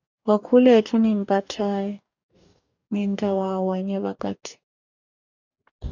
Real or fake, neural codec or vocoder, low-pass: fake; codec, 44.1 kHz, 2.6 kbps, DAC; 7.2 kHz